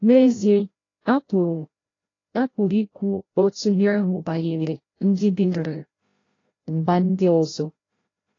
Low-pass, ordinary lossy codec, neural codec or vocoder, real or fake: 7.2 kHz; AAC, 32 kbps; codec, 16 kHz, 0.5 kbps, FreqCodec, larger model; fake